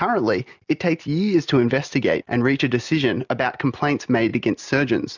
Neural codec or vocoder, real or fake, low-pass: none; real; 7.2 kHz